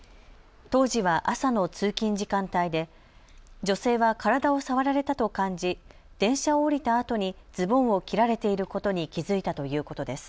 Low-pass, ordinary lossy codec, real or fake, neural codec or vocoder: none; none; real; none